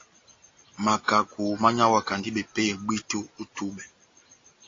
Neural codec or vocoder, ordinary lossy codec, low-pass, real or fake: none; AAC, 32 kbps; 7.2 kHz; real